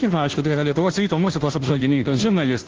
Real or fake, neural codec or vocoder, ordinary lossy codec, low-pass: fake; codec, 16 kHz, 0.5 kbps, FunCodec, trained on Chinese and English, 25 frames a second; Opus, 16 kbps; 7.2 kHz